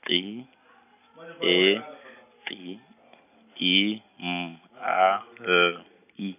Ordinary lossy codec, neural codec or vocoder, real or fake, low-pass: none; none; real; 3.6 kHz